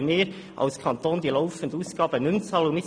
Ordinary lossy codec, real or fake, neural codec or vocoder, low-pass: none; real; none; 9.9 kHz